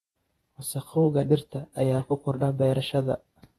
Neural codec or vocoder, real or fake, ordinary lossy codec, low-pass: vocoder, 48 kHz, 128 mel bands, Vocos; fake; AAC, 32 kbps; 19.8 kHz